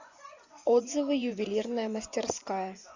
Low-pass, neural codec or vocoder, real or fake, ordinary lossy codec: 7.2 kHz; vocoder, 24 kHz, 100 mel bands, Vocos; fake; Opus, 64 kbps